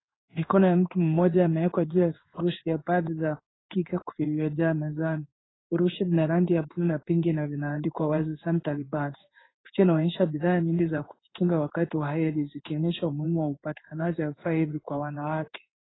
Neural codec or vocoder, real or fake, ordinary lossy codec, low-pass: codec, 16 kHz in and 24 kHz out, 1 kbps, XY-Tokenizer; fake; AAC, 16 kbps; 7.2 kHz